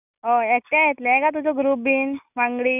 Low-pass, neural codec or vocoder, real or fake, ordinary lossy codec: 3.6 kHz; none; real; none